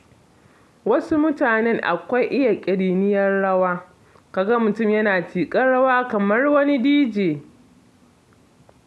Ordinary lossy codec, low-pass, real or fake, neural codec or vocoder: none; none; real; none